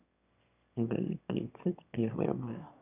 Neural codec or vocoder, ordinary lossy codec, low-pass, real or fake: autoencoder, 22.05 kHz, a latent of 192 numbers a frame, VITS, trained on one speaker; none; 3.6 kHz; fake